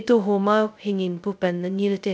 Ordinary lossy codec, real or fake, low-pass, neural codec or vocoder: none; fake; none; codec, 16 kHz, 0.2 kbps, FocalCodec